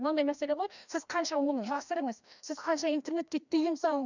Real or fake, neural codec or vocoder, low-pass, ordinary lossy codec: fake; codec, 16 kHz, 1 kbps, FreqCodec, larger model; 7.2 kHz; none